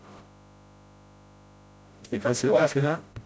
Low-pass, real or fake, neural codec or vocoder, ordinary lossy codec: none; fake; codec, 16 kHz, 0.5 kbps, FreqCodec, smaller model; none